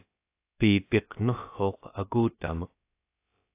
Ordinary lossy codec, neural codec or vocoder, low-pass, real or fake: AAC, 24 kbps; codec, 16 kHz, about 1 kbps, DyCAST, with the encoder's durations; 3.6 kHz; fake